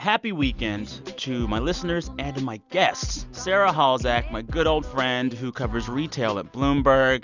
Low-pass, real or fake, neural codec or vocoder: 7.2 kHz; real; none